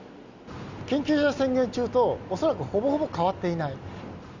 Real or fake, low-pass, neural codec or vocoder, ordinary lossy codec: real; 7.2 kHz; none; Opus, 64 kbps